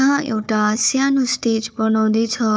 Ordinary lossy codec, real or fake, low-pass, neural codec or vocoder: none; fake; none; codec, 16 kHz, 4 kbps, FunCodec, trained on Chinese and English, 50 frames a second